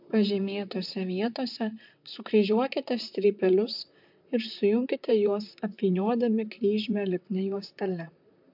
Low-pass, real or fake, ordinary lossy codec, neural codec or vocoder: 5.4 kHz; fake; MP3, 48 kbps; vocoder, 44.1 kHz, 128 mel bands, Pupu-Vocoder